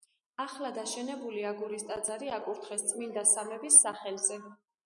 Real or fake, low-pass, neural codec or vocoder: real; 10.8 kHz; none